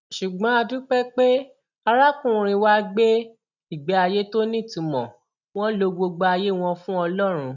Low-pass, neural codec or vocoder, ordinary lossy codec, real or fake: 7.2 kHz; none; none; real